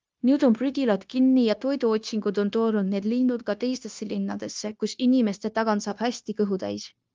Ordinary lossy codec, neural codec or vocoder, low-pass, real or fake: Opus, 32 kbps; codec, 16 kHz, 0.9 kbps, LongCat-Audio-Codec; 7.2 kHz; fake